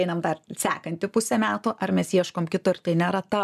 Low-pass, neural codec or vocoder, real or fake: 14.4 kHz; none; real